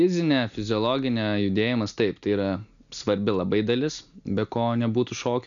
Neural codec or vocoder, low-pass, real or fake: none; 7.2 kHz; real